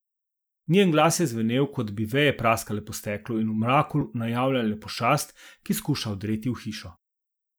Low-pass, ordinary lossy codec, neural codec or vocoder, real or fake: none; none; none; real